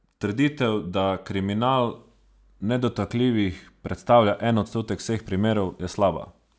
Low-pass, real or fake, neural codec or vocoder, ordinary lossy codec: none; real; none; none